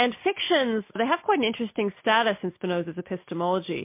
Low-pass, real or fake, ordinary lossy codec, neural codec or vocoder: 3.6 kHz; real; MP3, 24 kbps; none